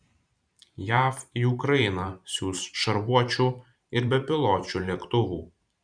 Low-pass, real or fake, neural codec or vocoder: 9.9 kHz; fake; vocoder, 44.1 kHz, 128 mel bands every 512 samples, BigVGAN v2